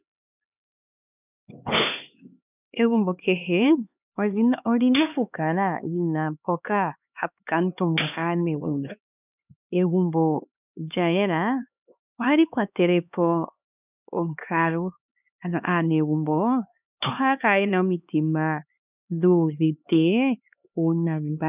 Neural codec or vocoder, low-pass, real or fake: codec, 16 kHz, 2 kbps, X-Codec, HuBERT features, trained on LibriSpeech; 3.6 kHz; fake